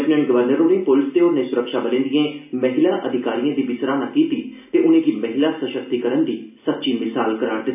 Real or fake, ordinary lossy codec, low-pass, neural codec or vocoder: real; none; 3.6 kHz; none